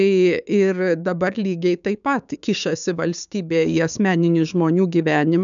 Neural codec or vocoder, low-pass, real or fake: codec, 16 kHz, 6 kbps, DAC; 7.2 kHz; fake